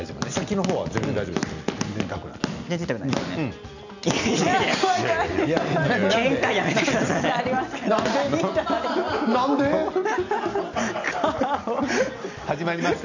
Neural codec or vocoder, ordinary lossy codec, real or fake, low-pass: none; none; real; 7.2 kHz